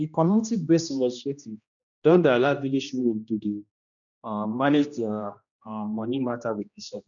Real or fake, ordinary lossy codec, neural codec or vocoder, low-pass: fake; MP3, 96 kbps; codec, 16 kHz, 1 kbps, X-Codec, HuBERT features, trained on general audio; 7.2 kHz